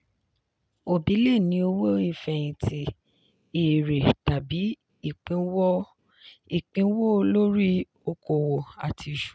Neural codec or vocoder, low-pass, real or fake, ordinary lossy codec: none; none; real; none